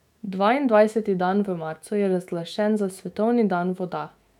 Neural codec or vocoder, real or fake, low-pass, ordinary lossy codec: autoencoder, 48 kHz, 128 numbers a frame, DAC-VAE, trained on Japanese speech; fake; 19.8 kHz; none